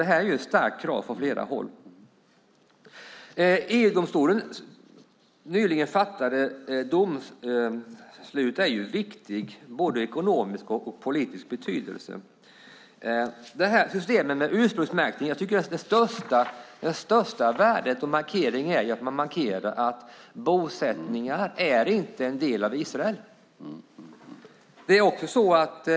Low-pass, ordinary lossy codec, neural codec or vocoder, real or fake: none; none; none; real